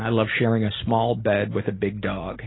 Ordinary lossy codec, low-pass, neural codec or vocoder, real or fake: AAC, 16 kbps; 7.2 kHz; none; real